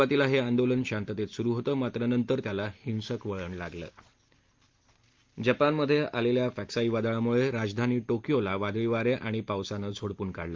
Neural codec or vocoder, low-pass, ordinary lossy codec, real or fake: none; 7.2 kHz; Opus, 32 kbps; real